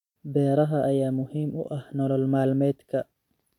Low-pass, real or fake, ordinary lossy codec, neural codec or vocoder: 19.8 kHz; fake; MP3, 96 kbps; vocoder, 44.1 kHz, 128 mel bands every 256 samples, BigVGAN v2